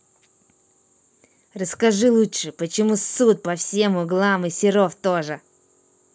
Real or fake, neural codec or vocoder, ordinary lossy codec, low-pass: real; none; none; none